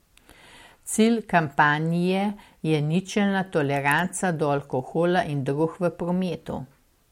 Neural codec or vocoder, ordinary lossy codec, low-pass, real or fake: none; MP3, 64 kbps; 19.8 kHz; real